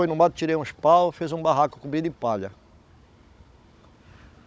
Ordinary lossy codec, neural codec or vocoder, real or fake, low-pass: none; codec, 16 kHz, 16 kbps, FunCodec, trained on Chinese and English, 50 frames a second; fake; none